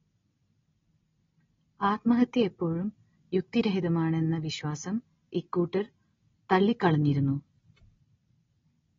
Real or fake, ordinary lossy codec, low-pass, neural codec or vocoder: real; AAC, 24 kbps; 7.2 kHz; none